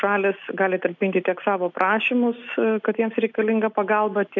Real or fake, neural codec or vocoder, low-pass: real; none; 7.2 kHz